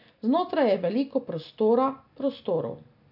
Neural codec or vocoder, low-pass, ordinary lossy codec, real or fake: none; 5.4 kHz; none; real